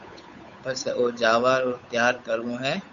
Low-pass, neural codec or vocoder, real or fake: 7.2 kHz; codec, 16 kHz, 8 kbps, FunCodec, trained on Chinese and English, 25 frames a second; fake